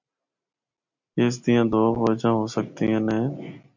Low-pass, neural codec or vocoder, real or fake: 7.2 kHz; none; real